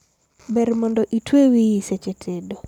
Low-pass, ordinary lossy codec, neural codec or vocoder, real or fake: 19.8 kHz; none; vocoder, 44.1 kHz, 128 mel bands every 256 samples, BigVGAN v2; fake